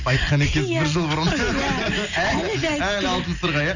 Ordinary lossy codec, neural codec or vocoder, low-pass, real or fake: none; none; 7.2 kHz; real